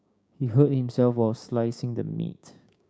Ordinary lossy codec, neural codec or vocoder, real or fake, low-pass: none; codec, 16 kHz, 6 kbps, DAC; fake; none